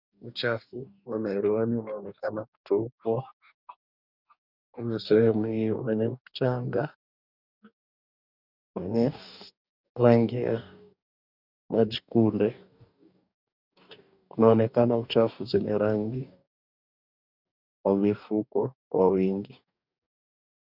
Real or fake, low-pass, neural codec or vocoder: fake; 5.4 kHz; codec, 44.1 kHz, 2.6 kbps, DAC